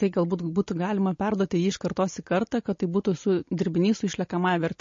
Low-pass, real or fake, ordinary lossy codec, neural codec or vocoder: 7.2 kHz; real; MP3, 32 kbps; none